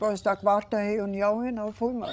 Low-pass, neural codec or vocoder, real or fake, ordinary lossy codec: none; codec, 16 kHz, 16 kbps, FreqCodec, larger model; fake; none